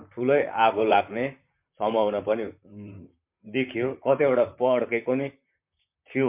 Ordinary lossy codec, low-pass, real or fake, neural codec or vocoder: MP3, 32 kbps; 3.6 kHz; fake; vocoder, 44.1 kHz, 128 mel bands, Pupu-Vocoder